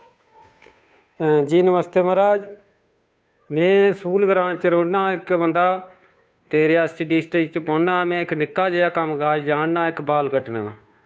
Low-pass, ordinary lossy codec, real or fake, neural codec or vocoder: none; none; fake; codec, 16 kHz, 2 kbps, FunCodec, trained on Chinese and English, 25 frames a second